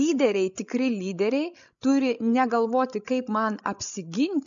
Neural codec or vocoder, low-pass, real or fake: codec, 16 kHz, 16 kbps, FreqCodec, larger model; 7.2 kHz; fake